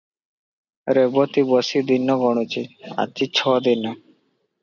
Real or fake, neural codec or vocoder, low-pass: real; none; 7.2 kHz